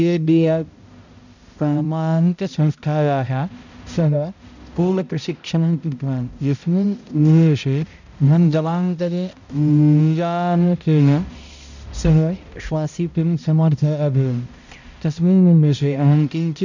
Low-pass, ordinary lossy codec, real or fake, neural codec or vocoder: 7.2 kHz; none; fake; codec, 16 kHz, 0.5 kbps, X-Codec, HuBERT features, trained on balanced general audio